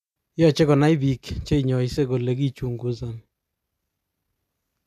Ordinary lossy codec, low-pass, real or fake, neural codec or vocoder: none; 14.4 kHz; real; none